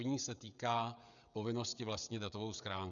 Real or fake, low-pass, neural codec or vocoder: fake; 7.2 kHz; codec, 16 kHz, 16 kbps, FreqCodec, smaller model